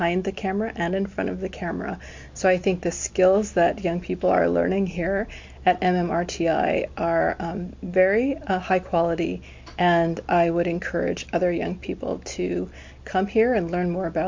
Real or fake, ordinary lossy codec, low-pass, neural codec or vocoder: real; MP3, 48 kbps; 7.2 kHz; none